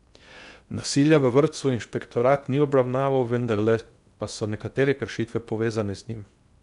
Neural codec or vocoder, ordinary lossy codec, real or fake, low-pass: codec, 16 kHz in and 24 kHz out, 0.8 kbps, FocalCodec, streaming, 65536 codes; none; fake; 10.8 kHz